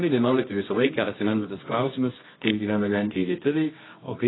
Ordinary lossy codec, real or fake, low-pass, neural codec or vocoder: AAC, 16 kbps; fake; 7.2 kHz; codec, 24 kHz, 0.9 kbps, WavTokenizer, medium music audio release